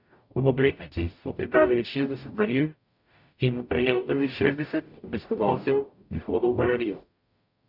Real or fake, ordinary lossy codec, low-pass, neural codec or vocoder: fake; none; 5.4 kHz; codec, 44.1 kHz, 0.9 kbps, DAC